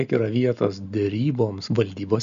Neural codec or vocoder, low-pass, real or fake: none; 7.2 kHz; real